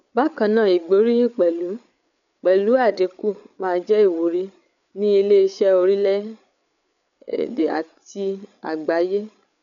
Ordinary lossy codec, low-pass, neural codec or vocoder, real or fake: none; 7.2 kHz; codec, 16 kHz, 8 kbps, FreqCodec, larger model; fake